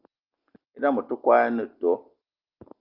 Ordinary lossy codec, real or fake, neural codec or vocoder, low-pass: Opus, 24 kbps; real; none; 5.4 kHz